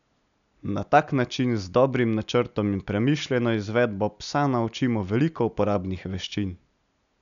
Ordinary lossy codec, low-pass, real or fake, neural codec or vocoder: none; 7.2 kHz; real; none